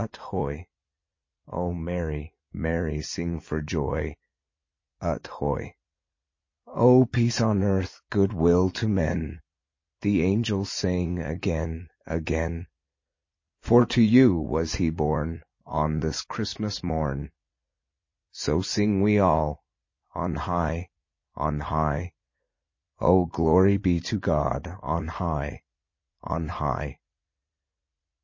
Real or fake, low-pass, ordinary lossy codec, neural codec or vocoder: fake; 7.2 kHz; MP3, 32 kbps; vocoder, 44.1 kHz, 80 mel bands, Vocos